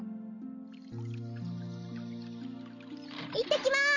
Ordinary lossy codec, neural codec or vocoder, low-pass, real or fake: none; none; 7.2 kHz; real